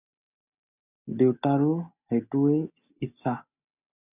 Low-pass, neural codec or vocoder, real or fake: 3.6 kHz; none; real